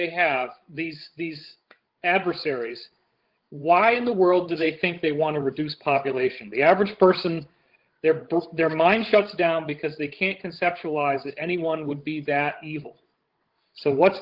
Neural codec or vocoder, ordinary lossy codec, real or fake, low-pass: codec, 16 kHz, 16 kbps, FreqCodec, larger model; Opus, 16 kbps; fake; 5.4 kHz